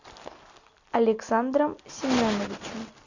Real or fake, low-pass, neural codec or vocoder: real; 7.2 kHz; none